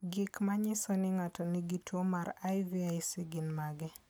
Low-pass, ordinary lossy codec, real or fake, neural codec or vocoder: none; none; real; none